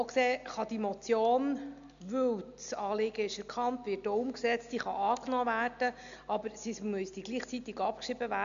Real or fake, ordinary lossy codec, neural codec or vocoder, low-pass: real; none; none; 7.2 kHz